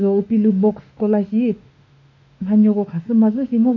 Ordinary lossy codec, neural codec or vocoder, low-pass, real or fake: none; autoencoder, 48 kHz, 32 numbers a frame, DAC-VAE, trained on Japanese speech; 7.2 kHz; fake